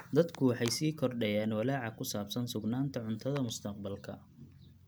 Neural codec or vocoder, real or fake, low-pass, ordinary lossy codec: none; real; none; none